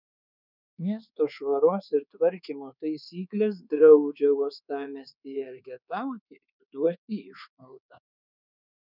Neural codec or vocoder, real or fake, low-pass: codec, 24 kHz, 1.2 kbps, DualCodec; fake; 5.4 kHz